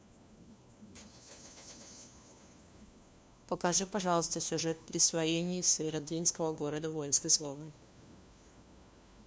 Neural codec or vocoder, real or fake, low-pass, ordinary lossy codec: codec, 16 kHz, 1 kbps, FunCodec, trained on LibriTTS, 50 frames a second; fake; none; none